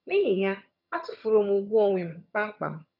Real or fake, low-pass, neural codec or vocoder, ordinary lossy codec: fake; 5.4 kHz; vocoder, 22.05 kHz, 80 mel bands, HiFi-GAN; none